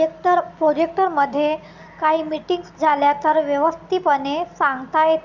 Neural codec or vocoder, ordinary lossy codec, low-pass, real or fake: none; none; 7.2 kHz; real